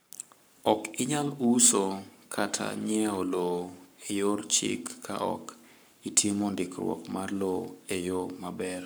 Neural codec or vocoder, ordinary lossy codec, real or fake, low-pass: codec, 44.1 kHz, 7.8 kbps, Pupu-Codec; none; fake; none